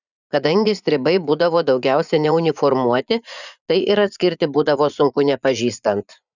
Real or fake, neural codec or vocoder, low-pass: fake; vocoder, 44.1 kHz, 80 mel bands, Vocos; 7.2 kHz